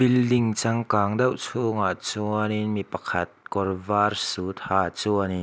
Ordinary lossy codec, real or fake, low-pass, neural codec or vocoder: none; real; none; none